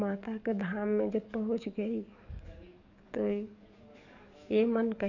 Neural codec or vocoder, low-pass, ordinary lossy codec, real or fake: codec, 44.1 kHz, 7.8 kbps, DAC; 7.2 kHz; none; fake